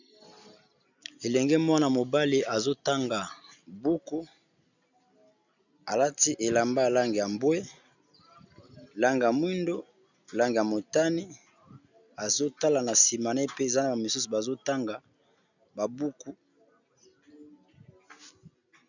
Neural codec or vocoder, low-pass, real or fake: none; 7.2 kHz; real